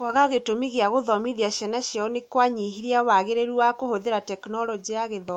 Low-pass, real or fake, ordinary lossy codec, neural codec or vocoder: 19.8 kHz; fake; MP3, 64 kbps; autoencoder, 48 kHz, 128 numbers a frame, DAC-VAE, trained on Japanese speech